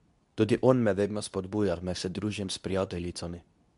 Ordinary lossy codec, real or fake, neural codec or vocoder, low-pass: none; fake; codec, 24 kHz, 0.9 kbps, WavTokenizer, medium speech release version 2; 10.8 kHz